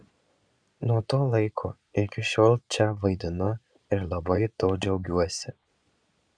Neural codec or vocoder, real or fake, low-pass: vocoder, 22.05 kHz, 80 mel bands, Vocos; fake; 9.9 kHz